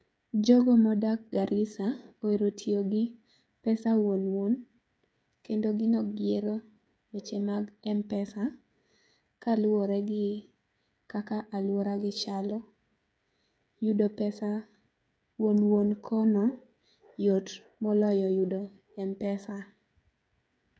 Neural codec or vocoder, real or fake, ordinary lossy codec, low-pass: codec, 16 kHz, 16 kbps, FreqCodec, smaller model; fake; none; none